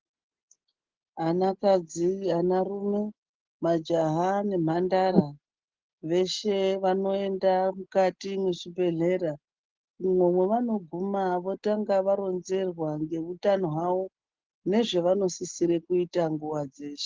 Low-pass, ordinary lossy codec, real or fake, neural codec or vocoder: 7.2 kHz; Opus, 16 kbps; real; none